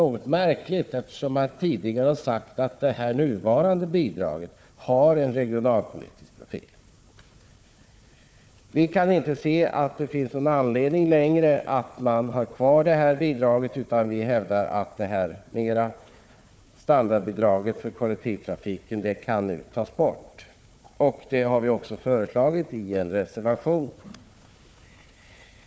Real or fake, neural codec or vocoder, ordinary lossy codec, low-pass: fake; codec, 16 kHz, 4 kbps, FunCodec, trained on Chinese and English, 50 frames a second; none; none